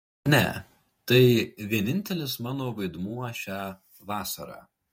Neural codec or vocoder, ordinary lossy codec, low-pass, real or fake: vocoder, 48 kHz, 128 mel bands, Vocos; MP3, 64 kbps; 19.8 kHz; fake